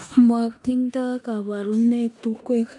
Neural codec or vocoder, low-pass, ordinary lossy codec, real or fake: codec, 16 kHz in and 24 kHz out, 0.9 kbps, LongCat-Audio-Codec, four codebook decoder; 10.8 kHz; none; fake